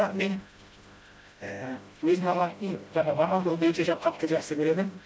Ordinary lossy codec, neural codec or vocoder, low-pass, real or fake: none; codec, 16 kHz, 0.5 kbps, FreqCodec, smaller model; none; fake